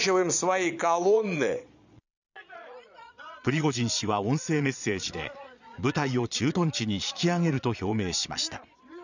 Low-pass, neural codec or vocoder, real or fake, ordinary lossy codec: 7.2 kHz; vocoder, 22.05 kHz, 80 mel bands, Vocos; fake; none